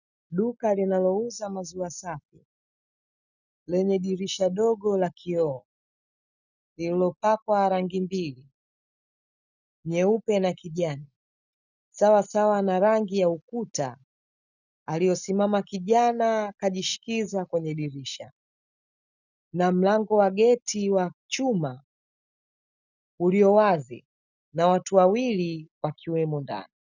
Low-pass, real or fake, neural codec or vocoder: 7.2 kHz; real; none